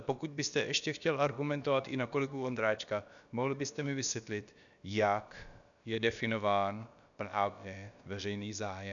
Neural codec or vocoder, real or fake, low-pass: codec, 16 kHz, about 1 kbps, DyCAST, with the encoder's durations; fake; 7.2 kHz